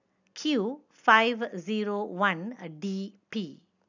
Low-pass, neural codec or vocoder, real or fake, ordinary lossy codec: 7.2 kHz; none; real; none